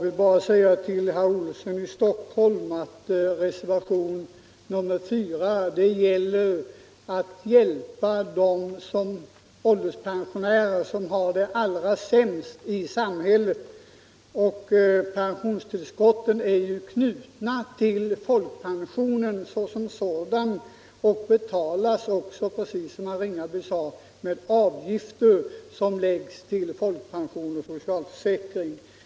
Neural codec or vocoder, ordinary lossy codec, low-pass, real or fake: none; none; none; real